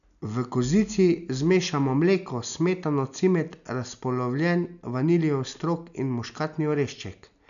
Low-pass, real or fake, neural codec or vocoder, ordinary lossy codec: 7.2 kHz; real; none; none